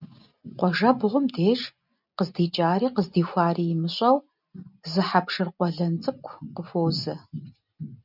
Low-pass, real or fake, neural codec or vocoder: 5.4 kHz; real; none